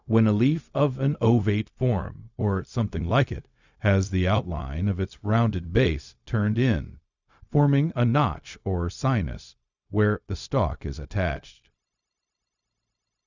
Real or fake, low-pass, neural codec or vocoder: fake; 7.2 kHz; codec, 16 kHz, 0.4 kbps, LongCat-Audio-Codec